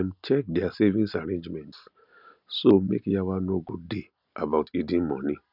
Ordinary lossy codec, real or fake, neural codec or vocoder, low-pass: none; real; none; 5.4 kHz